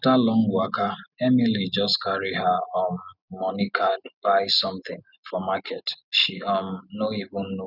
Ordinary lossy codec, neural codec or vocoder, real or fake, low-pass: none; none; real; 5.4 kHz